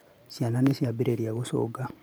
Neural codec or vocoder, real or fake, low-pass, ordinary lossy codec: vocoder, 44.1 kHz, 128 mel bands every 512 samples, BigVGAN v2; fake; none; none